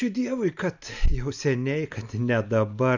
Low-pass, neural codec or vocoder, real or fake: 7.2 kHz; none; real